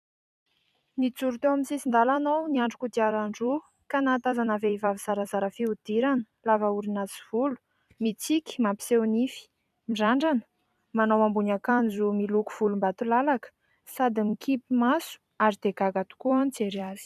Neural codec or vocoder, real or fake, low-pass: vocoder, 44.1 kHz, 128 mel bands every 256 samples, BigVGAN v2; fake; 14.4 kHz